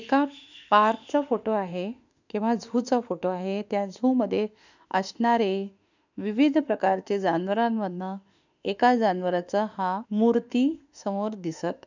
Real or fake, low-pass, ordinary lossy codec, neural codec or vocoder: fake; 7.2 kHz; none; autoencoder, 48 kHz, 32 numbers a frame, DAC-VAE, trained on Japanese speech